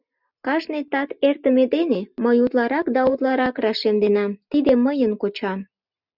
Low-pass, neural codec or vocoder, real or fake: 5.4 kHz; none; real